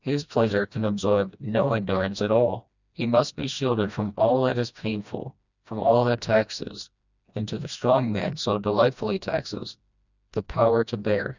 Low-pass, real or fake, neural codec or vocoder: 7.2 kHz; fake; codec, 16 kHz, 1 kbps, FreqCodec, smaller model